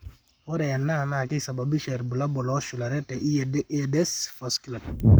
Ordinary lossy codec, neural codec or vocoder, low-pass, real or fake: none; codec, 44.1 kHz, 7.8 kbps, Pupu-Codec; none; fake